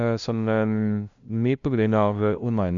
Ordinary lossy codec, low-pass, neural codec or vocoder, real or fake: none; 7.2 kHz; codec, 16 kHz, 0.5 kbps, FunCodec, trained on LibriTTS, 25 frames a second; fake